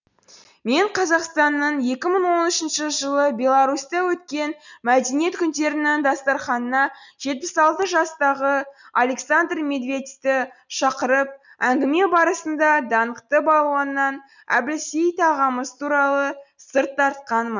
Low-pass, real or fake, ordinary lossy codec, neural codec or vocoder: 7.2 kHz; real; none; none